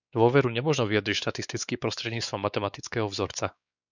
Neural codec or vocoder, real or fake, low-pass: codec, 16 kHz, 4 kbps, X-Codec, WavLM features, trained on Multilingual LibriSpeech; fake; 7.2 kHz